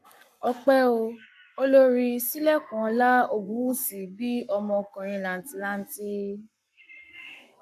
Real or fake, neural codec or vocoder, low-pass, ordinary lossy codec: fake; codec, 44.1 kHz, 7.8 kbps, Pupu-Codec; 14.4 kHz; none